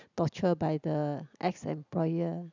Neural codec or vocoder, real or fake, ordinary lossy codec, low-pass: none; real; none; 7.2 kHz